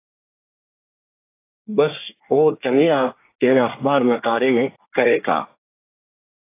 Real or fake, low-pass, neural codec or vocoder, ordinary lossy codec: fake; 3.6 kHz; codec, 24 kHz, 1 kbps, SNAC; AAC, 24 kbps